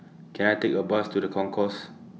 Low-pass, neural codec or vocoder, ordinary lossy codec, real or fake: none; none; none; real